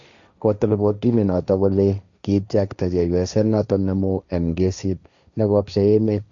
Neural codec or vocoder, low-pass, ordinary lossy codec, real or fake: codec, 16 kHz, 1.1 kbps, Voila-Tokenizer; 7.2 kHz; none; fake